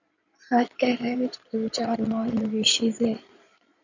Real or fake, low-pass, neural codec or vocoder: fake; 7.2 kHz; codec, 16 kHz in and 24 kHz out, 2.2 kbps, FireRedTTS-2 codec